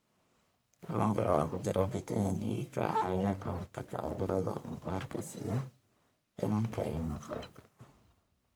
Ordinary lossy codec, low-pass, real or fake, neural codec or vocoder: none; none; fake; codec, 44.1 kHz, 1.7 kbps, Pupu-Codec